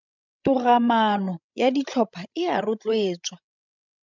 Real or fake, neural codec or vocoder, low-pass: fake; codec, 16 kHz, 16 kbps, FreqCodec, larger model; 7.2 kHz